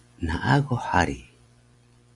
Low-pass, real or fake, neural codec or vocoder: 10.8 kHz; real; none